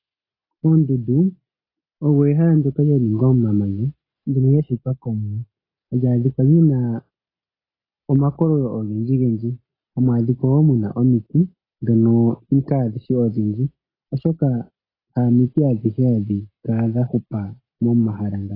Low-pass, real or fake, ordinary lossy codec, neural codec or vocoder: 5.4 kHz; real; AAC, 24 kbps; none